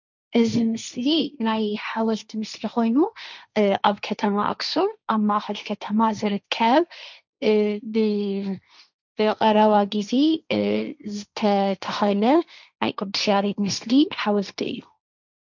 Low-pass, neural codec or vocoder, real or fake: 7.2 kHz; codec, 16 kHz, 1.1 kbps, Voila-Tokenizer; fake